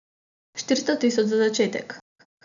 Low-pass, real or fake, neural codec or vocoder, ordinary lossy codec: 7.2 kHz; real; none; none